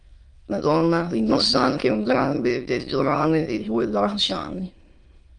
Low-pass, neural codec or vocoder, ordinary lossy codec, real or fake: 9.9 kHz; autoencoder, 22.05 kHz, a latent of 192 numbers a frame, VITS, trained on many speakers; Opus, 32 kbps; fake